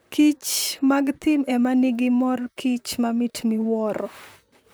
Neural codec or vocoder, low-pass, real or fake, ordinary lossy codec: vocoder, 44.1 kHz, 128 mel bands, Pupu-Vocoder; none; fake; none